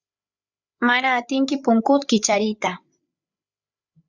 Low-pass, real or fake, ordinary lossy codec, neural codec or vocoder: 7.2 kHz; fake; Opus, 64 kbps; codec, 16 kHz, 8 kbps, FreqCodec, larger model